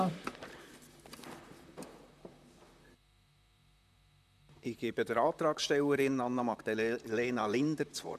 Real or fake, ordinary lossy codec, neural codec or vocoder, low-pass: fake; MP3, 96 kbps; vocoder, 44.1 kHz, 128 mel bands, Pupu-Vocoder; 14.4 kHz